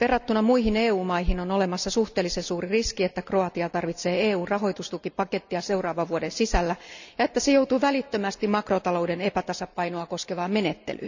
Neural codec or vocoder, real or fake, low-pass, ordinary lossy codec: none; real; 7.2 kHz; none